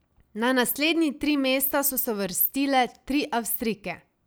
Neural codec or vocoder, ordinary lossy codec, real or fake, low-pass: none; none; real; none